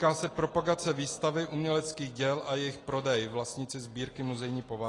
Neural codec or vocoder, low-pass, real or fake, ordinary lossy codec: none; 10.8 kHz; real; AAC, 32 kbps